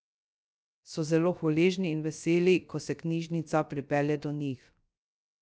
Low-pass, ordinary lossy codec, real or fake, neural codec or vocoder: none; none; fake; codec, 16 kHz, 0.3 kbps, FocalCodec